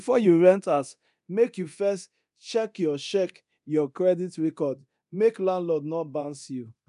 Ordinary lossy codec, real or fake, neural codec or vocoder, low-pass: none; fake; codec, 24 kHz, 0.9 kbps, DualCodec; 10.8 kHz